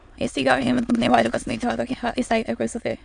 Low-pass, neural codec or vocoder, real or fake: 9.9 kHz; autoencoder, 22.05 kHz, a latent of 192 numbers a frame, VITS, trained on many speakers; fake